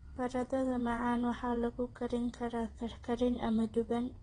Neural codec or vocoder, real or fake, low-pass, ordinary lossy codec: vocoder, 22.05 kHz, 80 mel bands, WaveNeXt; fake; 9.9 kHz; AAC, 32 kbps